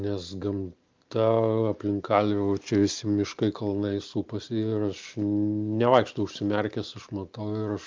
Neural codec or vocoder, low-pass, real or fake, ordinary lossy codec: none; 7.2 kHz; real; Opus, 24 kbps